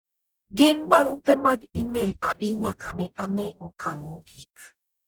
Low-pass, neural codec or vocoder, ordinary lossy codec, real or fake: none; codec, 44.1 kHz, 0.9 kbps, DAC; none; fake